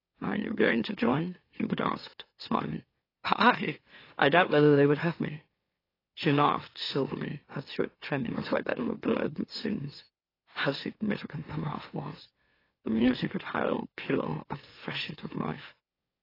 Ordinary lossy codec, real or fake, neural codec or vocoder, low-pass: AAC, 24 kbps; fake; autoencoder, 44.1 kHz, a latent of 192 numbers a frame, MeloTTS; 5.4 kHz